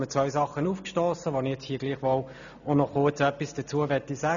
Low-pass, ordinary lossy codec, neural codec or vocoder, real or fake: 7.2 kHz; none; none; real